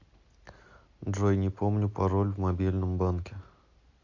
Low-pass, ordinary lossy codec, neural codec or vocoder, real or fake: 7.2 kHz; AAC, 48 kbps; none; real